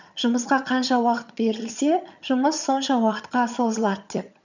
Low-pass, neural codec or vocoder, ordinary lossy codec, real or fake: 7.2 kHz; vocoder, 22.05 kHz, 80 mel bands, HiFi-GAN; none; fake